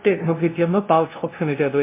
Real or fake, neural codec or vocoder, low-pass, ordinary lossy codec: fake; codec, 16 kHz, 0.5 kbps, FunCodec, trained on LibriTTS, 25 frames a second; 3.6 kHz; MP3, 24 kbps